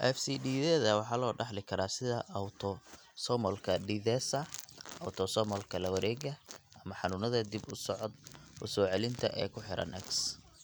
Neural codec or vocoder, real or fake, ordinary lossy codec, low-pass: none; real; none; none